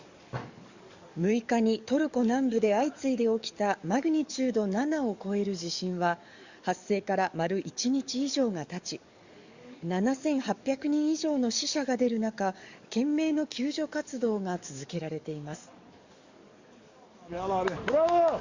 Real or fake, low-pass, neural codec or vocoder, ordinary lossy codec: fake; 7.2 kHz; codec, 44.1 kHz, 7.8 kbps, DAC; Opus, 64 kbps